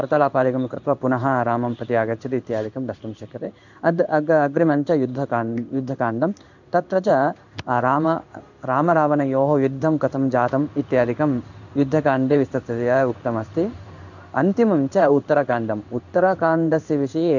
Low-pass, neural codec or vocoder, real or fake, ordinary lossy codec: 7.2 kHz; codec, 16 kHz in and 24 kHz out, 1 kbps, XY-Tokenizer; fake; none